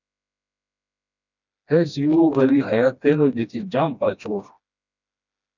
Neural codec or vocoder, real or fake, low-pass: codec, 16 kHz, 1 kbps, FreqCodec, smaller model; fake; 7.2 kHz